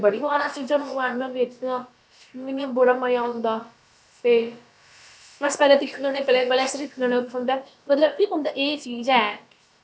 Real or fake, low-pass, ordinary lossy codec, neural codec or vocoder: fake; none; none; codec, 16 kHz, about 1 kbps, DyCAST, with the encoder's durations